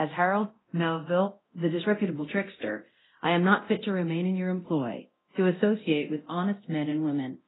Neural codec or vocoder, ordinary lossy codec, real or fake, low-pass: codec, 24 kHz, 0.9 kbps, DualCodec; AAC, 16 kbps; fake; 7.2 kHz